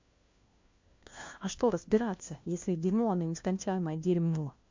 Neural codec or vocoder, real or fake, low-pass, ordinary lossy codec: codec, 16 kHz, 1 kbps, FunCodec, trained on LibriTTS, 50 frames a second; fake; 7.2 kHz; MP3, 48 kbps